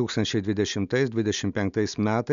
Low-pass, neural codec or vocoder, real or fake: 7.2 kHz; none; real